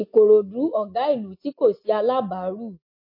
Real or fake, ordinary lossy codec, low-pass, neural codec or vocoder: real; MP3, 32 kbps; 5.4 kHz; none